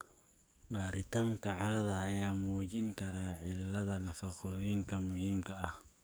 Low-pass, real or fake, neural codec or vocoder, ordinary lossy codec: none; fake; codec, 44.1 kHz, 2.6 kbps, SNAC; none